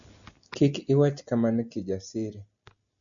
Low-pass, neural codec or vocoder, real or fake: 7.2 kHz; none; real